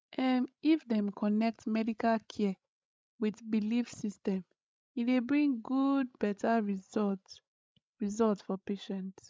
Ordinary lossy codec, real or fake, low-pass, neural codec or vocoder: none; fake; none; codec, 16 kHz, 16 kbps, FreqCodec, larger model